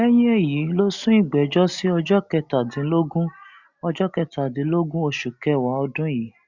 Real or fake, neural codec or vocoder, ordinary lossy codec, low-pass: real; none; Opus, 64 kbps; 7.2 kHz